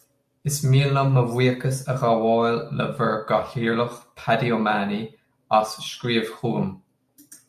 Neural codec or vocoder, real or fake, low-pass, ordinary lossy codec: none; real; 14.4 kHz; AAC, 96 kbps